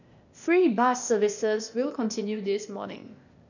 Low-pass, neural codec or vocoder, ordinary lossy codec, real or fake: 7.2 kHz; codec, 16 kHz, 0.8 kbps, ZipCodec; none; fake